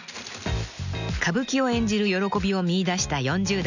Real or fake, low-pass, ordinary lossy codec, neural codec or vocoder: real; 7.2 kHz; none; none